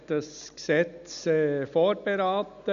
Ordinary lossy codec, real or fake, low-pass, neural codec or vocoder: none; real; 7.2 kHz; none